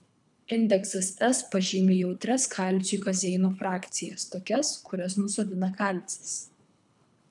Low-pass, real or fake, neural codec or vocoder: 10.8 kHz; fake; codec, 24 kHz, 3 kbps, HILCodec